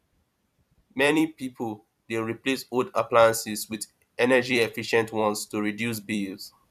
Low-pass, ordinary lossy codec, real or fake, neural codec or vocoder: 14.4 kHz; none; fake; vocoder, 44.1 kHz, 128 mel bands every 256 samples, BigVGAN v2